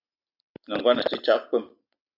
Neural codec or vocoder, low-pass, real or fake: none; 5.4 kHz; real